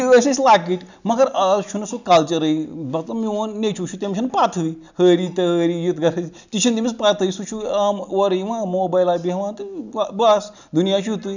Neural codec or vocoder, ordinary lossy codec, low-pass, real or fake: none; none; 7.2 kHz; real